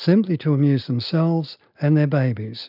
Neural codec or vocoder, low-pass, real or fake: none; 5.4 kHz; real